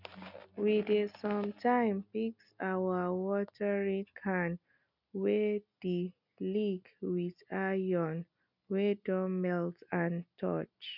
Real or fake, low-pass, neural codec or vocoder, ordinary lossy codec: real; 5.4 kHz; none; none